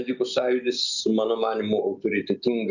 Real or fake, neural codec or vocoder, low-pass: real; none; 7.2 kHz